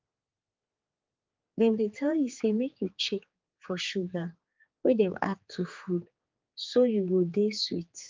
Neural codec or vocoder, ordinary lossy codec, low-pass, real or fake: codec, 16 kHz, 4 kbps, X-Codec, HuBERT features, trained on general audio; Opus, 24 kbps; 7.2 kHz; fake